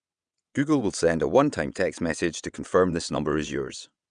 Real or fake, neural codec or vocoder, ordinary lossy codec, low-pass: fake; vocoder, 22.05 kHz, 80 mel bands, WaveNeXt; none; 9.9 kHz